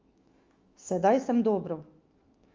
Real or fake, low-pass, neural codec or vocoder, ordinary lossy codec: fake; 7.2 kHz; autoencoder, 48 kHz, 128 numbers a frame, DAC-VAE, trained on Japanese speech; Opus, 32 kbps